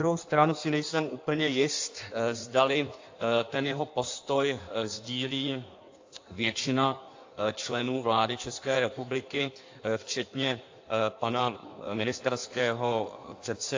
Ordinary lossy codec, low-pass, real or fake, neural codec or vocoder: AAC, 48 kbps; 7.2 kHz; fake; codec, 16 kHz in and 24 kHz out, 1.1 kbps, FireRedTTS-2 codec